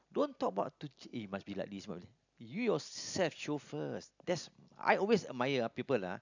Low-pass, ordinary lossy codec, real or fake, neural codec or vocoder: 7.2 kHz; none; real; none